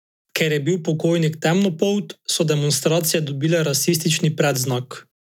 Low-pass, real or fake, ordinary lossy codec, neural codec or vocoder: 19.8 kHz; real; none; none